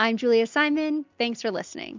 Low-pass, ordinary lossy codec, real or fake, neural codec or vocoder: 7.2 kHz; MP3, 64 kbps; real; none